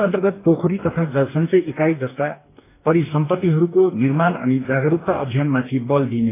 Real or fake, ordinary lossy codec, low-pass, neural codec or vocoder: fake; AAC, 24 kbps; 3.6 kHz; codec, 44.1 kHz, 2.6 kbps, DAC